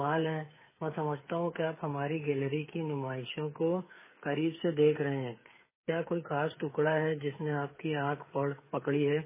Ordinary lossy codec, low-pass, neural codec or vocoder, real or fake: MP3, 16 kbps; 3.6 kHz; codec, 16 kHz, 8 kbps, FreqCodec, smaller model; fake